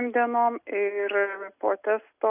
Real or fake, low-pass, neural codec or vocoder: real; 3.6 kHz; none